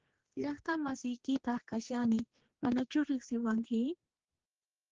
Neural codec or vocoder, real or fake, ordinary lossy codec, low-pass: codec, 16 kHz, 2 kbps, X-Codec, HuBERT features, trained on general audio; fake; Opus, 16 kbps; 7.2 kHz